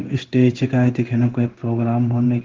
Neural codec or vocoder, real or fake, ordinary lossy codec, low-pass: codec, 16 kHz in and 24 kHz out, 1 kbps, XY-Tokenizer; fake; Opus, 16 kbps; 7.2 kHz